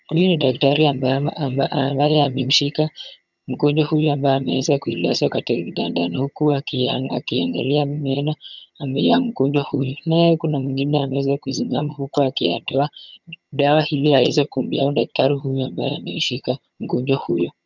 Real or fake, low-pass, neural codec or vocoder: fake; 7.2 kHz; vocoder, 22.05 kHz, 80 mel bands, HiFi-GAN